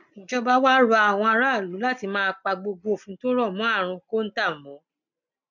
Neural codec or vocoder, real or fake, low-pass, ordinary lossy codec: none; real; 7.2 kHz; none